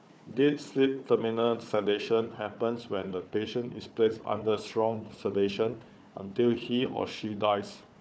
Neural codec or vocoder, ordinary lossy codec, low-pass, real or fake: codec, 16 kHz, 4 kbps, FunCodec, trained on Chinese and English, 50 frames a second; none; none; fake